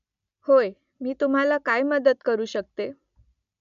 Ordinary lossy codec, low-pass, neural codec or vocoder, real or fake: none; 7.2 kHz; none; real